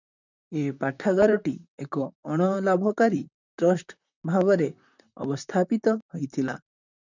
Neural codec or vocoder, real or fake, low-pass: vocoder, 44.1 kHz, 128 mel bands, Pupu-Vocoder; fake; 7.2 kHz